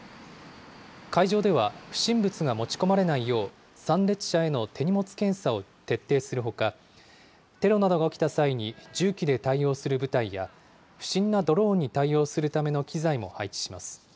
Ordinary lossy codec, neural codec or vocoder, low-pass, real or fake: none; none; none; real